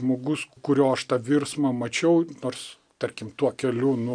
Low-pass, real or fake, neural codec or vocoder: 9.9 kHz; real; none